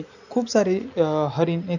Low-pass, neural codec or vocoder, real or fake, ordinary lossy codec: 7.2 kHz; none; real; none